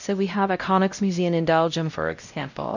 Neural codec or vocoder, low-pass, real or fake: codec, 16 kHz, 0.5 kbps, X-Codec, WavLM features, trained on Multilingual LibriSpeech; 7.2 kHz; fake